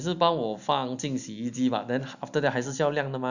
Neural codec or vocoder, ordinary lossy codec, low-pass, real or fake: none; none; 7.2 kHz; real